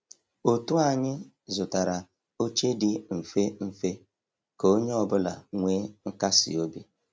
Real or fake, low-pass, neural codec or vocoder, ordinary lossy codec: real; none; none; none